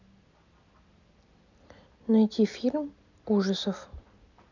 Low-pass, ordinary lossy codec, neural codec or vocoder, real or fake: 7.2 kHz; none; none; real